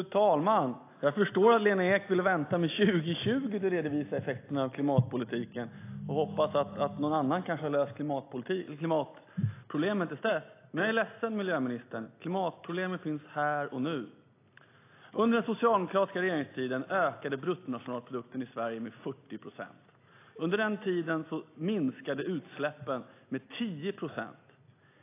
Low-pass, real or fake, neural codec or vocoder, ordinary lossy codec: 3.6 kHz; real; none; AAC, 24 kbps